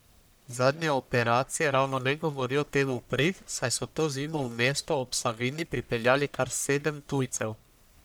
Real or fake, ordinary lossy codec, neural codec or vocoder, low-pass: fake; none; codec, 44.1 kHz, 1.7 kbps, Pupu-Codec; none